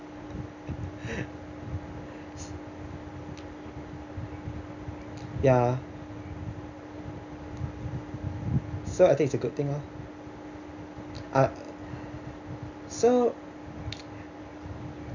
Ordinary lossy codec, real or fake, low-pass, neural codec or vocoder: none; real; 7.2 kHz; none